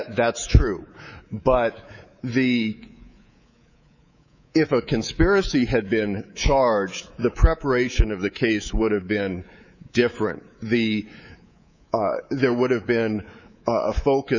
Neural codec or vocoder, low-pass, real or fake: codec, 24 kHz, 3.1 kbps, DualCodec; 7.2 kHz; fake